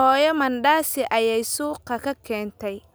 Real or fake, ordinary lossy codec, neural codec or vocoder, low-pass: real; none; none; none